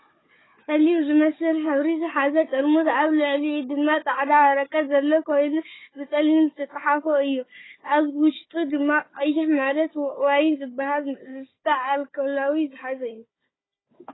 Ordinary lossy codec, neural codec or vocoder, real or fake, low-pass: AAC, 16 kbps; codec, 16 kHz, 4 kbps, FunCodec, trained on Chinese and English, 50 frames a second; fake; 7.2 kHz